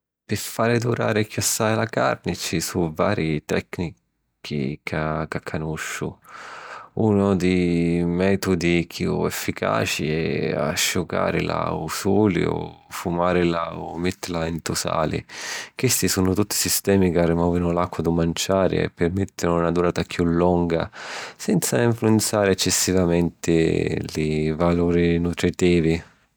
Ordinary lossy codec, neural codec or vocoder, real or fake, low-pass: none; none; real; none